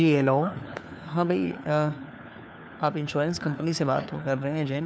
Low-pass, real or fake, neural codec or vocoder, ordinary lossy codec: none; fake; codec, 16 kHz, 4 kbps, FunCodec, trained on LibriTTS, 50 frames a second; none